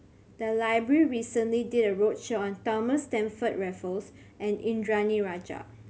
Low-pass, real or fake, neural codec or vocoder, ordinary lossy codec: none; real; none; none